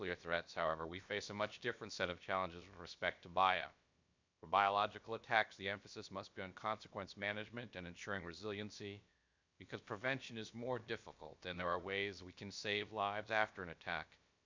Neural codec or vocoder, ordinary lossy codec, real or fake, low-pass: codec, 16 kHz, about 1 kbps, DyCAST, with the encoder's durations; MP3, 64 kbps; fake; 7.2 kHz